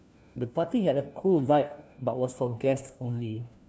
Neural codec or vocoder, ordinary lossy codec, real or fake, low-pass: codec, 16 kHz, 1 kbps, FunCodec, trained on LibriTTS, 50 frames a second; none; fake; none